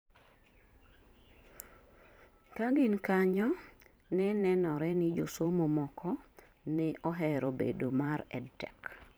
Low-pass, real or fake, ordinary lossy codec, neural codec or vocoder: none; fake; none; vocoder, 44.1 kHz, 128 mel bands every 512 samples, BigVGAN v2